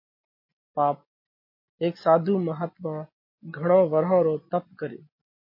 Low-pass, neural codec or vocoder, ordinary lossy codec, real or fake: 5.4 kHz; none; MP3, 32 kbps; real